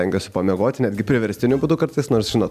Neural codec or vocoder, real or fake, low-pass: none; real; 14.4 kHz